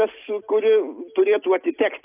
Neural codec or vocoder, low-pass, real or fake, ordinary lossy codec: none; 3.6 kHz; real; AAC, 24 kbps